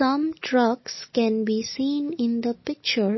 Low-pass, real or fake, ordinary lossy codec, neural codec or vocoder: 7.2 kHz; real; MP3, 24 kbps; none